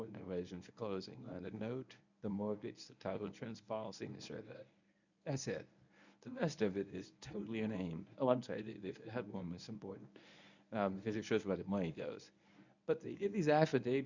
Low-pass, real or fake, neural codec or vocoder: 7.2 kHz; fake; codec, 24 kHz, 0.9 kbps, WavTokenizer, medium speech release version 1